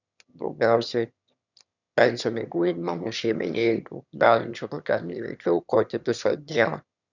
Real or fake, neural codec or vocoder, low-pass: fake; autoencoder, 22.05 kHz, a latent of 192 numbers a frame, VITS, trained on one speaker; 7.2 kHz